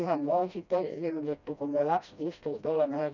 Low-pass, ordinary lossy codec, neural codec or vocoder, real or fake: 7.2 kHz; none; codec, 16 kHz, 1 kbps, FreqCodec, smaller model; fake